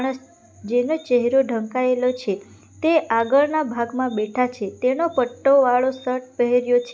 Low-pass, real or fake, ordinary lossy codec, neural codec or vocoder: none; real; none; none